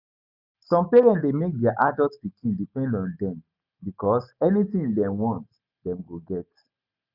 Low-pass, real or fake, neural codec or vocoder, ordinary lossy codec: 5.4 kHz; real; none; none